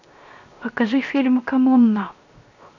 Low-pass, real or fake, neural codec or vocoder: 7.2 kHz; fake; codec, 16 kHz, 0.7 kbps, FocalCodec